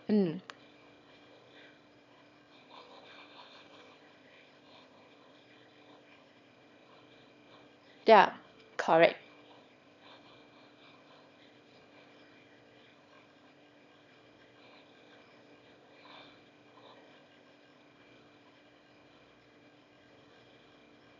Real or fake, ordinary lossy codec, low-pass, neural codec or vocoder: fake; none; 7.2 kHz; autoencoder, 22.05 kHz, a latent of 192 numbers a frame, VITS, trained on one speaker